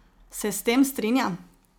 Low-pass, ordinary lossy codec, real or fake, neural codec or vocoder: none; none; real; none